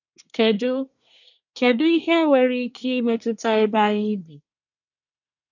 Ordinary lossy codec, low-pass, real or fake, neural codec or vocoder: none; 7.2 kHz; fake; codec, 24 kHz, 1 kbps, SNAC